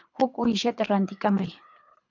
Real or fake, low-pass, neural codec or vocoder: fake; 7.2 kHz; codec, 24 kHz, 3 kbps, HILCodec